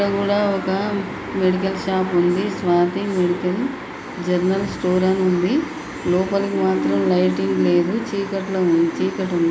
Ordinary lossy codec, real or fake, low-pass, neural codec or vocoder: none; real; none; none